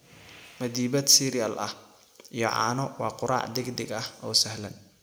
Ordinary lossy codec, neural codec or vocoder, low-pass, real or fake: none; none; none; real